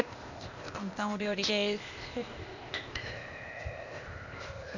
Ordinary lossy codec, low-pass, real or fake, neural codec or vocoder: none; 7.2 kHz; fake; codec, 16 kHz, 0.8 kbps, ZipCodec